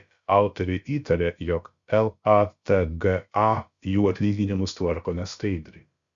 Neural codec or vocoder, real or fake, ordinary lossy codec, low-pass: codec, 16 kHz, about 1 kbps, DyCAST, with the encoder's durations; fake; Opus, 64 kbps; 7.2 kHz